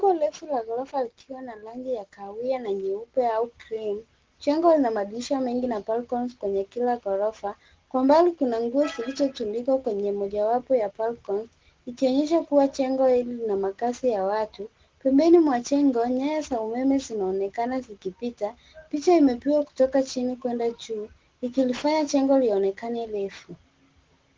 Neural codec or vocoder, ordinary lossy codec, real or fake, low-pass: none; Opus, 16 kbps; real; 7.2 kHz